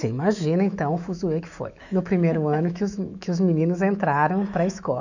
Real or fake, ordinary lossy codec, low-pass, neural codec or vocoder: fake; none; 7.2 kHz; autoencoder, 48 kHz, 128 numbers a frame, DAC-VAE, trained on Japanese speech